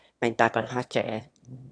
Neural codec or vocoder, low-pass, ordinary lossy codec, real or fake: autoencoder, 22.05 kHz, a latent of 192 numbers a frame, VITS, trained on one speaker; 9.9 kHz; AAC, 48 kbps; fake